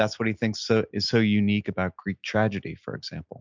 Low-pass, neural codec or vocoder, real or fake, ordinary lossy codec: 7.2 kHz; none; real; MP3, 64 kbps